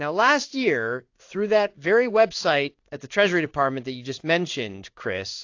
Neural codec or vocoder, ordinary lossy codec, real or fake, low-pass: codec, 16 kHz in and 24 kHz out, 1 kbps, XY-Tokenizer; AAC, 48 kbps; fake; 7.2 kHz